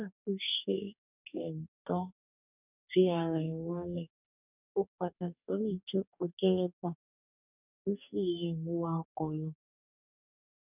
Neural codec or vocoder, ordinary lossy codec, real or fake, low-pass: codec, 44.1 kHz, 2.6 kbps, DAC; none; fake; 3.6 kHz